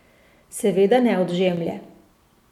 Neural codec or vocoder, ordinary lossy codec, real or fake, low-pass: none; MP3, 96 kbps; real; 19.8 kHz